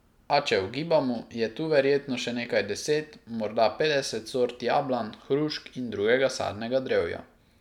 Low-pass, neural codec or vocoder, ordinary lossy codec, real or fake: 19.8 kHz; none; none; real